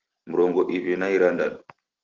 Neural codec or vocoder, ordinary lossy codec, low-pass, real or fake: none; Opus, 16 kbps; 7.2 kHz; real